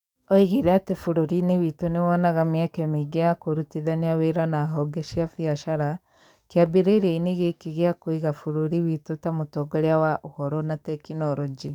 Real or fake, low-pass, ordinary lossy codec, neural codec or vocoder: fake; 19.8 kHz; none; codec, 44.1 kHz, 7.8 kbps, DAC